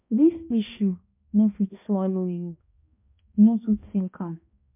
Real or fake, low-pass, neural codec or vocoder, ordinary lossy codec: fake; 3.6 kHz; codec, 16 kHz, 1 kbps, X-Codec, HuBERT features, trained on balanced general audio; MP3, 32 kbps